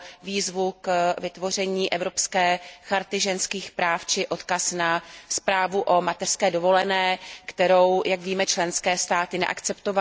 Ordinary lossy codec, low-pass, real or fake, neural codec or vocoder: none; none; real; none